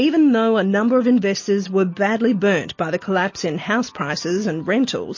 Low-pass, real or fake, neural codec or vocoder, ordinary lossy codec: 7.2 kHz; real; none; MP3, 32 kbps